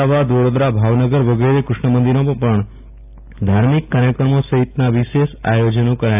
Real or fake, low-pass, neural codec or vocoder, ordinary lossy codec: real; 3.6 kHz; none; AAC, 32 kbps